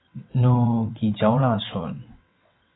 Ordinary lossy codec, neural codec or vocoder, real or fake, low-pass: AAC, 16 kbps; vocoder, 22.05 kHz, 80 mel bands, WaveNeXt; fake; 7.2 kHz